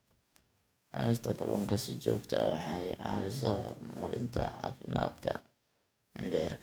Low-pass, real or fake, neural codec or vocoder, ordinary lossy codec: none; fake; codec, 44.1 kHz, 2.6 kbps, DAC; none